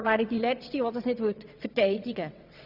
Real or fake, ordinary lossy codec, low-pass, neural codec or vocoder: fake; none; 5.4 kHz; vocoder, 22.05 kHz, 80 mel bands, WaveNeXt